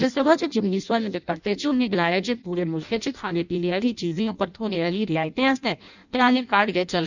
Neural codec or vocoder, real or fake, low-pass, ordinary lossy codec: codec, 16 kHz in and 24 kHz out, 0.6 kbps, FireRedTTS-2 codec; fake; 7.2 kHz; none